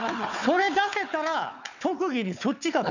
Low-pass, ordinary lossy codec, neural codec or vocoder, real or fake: 7.2 kHz; none; codec, 16 kHz, 4 kbps, FunCodec, trained on Chinese and English, 50 frames a second; fake